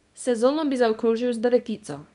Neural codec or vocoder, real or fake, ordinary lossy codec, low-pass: codec, 24 kHz, 0.9 kbps, WavTokenizer, small release; fake; none; 10.8 kHz